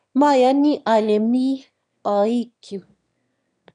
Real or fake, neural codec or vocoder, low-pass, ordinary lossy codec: fake; autoencoder, 22.05 kHz, a latent of 192 numbers a frame, VITS, trained on one speaker; 9.9 kHz; none